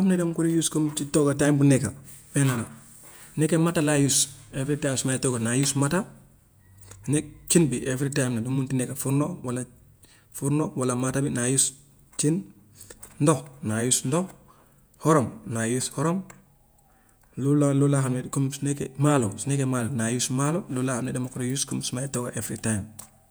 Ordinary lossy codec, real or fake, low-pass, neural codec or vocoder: none; fake; none; vocoder, 48 kHz, 128 mel bands, Vocos